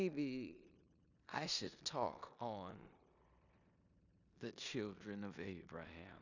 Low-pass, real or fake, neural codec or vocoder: 7.2 kHz; fake; codec, 16 kHz in and 24 kHz out, 0.9 kbps, LongCat-Audio-Codec, four codebook decoder